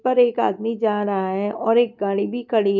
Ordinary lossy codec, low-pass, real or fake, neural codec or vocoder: none; 7.2 kHz; real; none